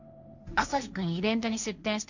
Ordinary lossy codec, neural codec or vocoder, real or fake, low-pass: none; codec, 16 kHz, 1.1 kbps, Voila-Tokenizer; fake; 7.2 kHz